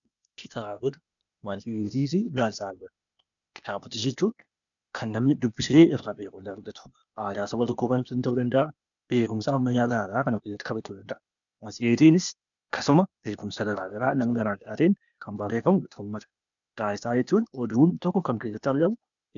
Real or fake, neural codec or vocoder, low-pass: fake; codec, 16 kHz, 0.8 kbps, ZipCodec; 7.2 kHz